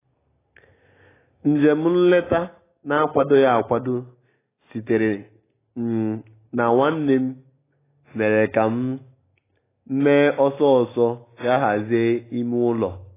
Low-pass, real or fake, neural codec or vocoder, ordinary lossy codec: 3.6 kHz; real; none; AAC, 16 kbps